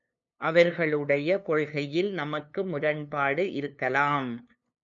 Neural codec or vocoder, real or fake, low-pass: codec, 16 kHz, 2 kbps, FunCodec, trained on LibriTTS, 25 frames a second; fake; 7.2 kHz